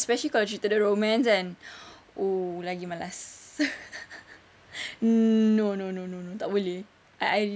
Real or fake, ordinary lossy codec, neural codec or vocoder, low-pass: real; none; none; none